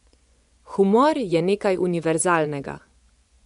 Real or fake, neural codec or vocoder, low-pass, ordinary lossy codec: fake; vocoder, 24 kHz, 100 mel bands, Vocos; 10.8 kHz; none